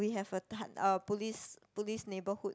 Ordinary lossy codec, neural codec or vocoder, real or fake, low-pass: none; none; real; none